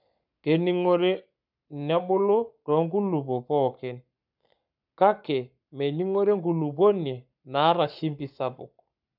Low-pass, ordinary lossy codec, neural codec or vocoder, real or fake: 5.4 kHz; none; codec, 44.1 kHz, 7.8 kbps, Pupu-Codec; fake